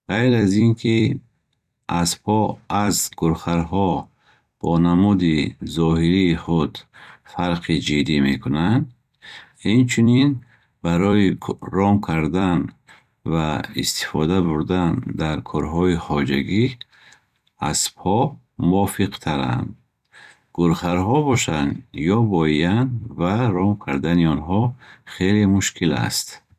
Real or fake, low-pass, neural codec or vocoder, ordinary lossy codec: fake; 14.4 kHz; vocoder, 44.1 kHz, 128 mel bands every 256 samples, BigVGAN v2; none